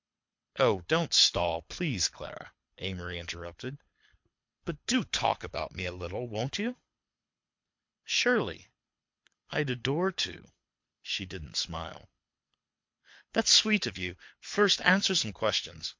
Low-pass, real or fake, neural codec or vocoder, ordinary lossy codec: 7.2 kHz; fake; codec, 24 kHz, 6 kbps, HILCodec; MP3, 48 kbps